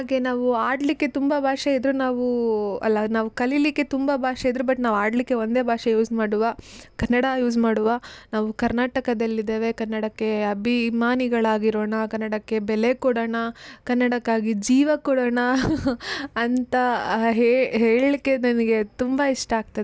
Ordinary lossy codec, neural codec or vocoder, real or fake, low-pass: none; none; real; none